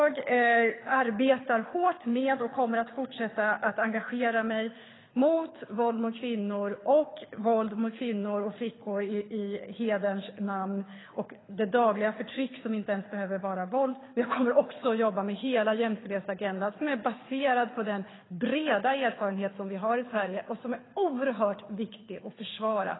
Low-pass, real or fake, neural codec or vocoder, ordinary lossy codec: 7.2 kHz; fake; codec, 24 kHz, 6 kbps, HILCodec; AAC, 16 kbps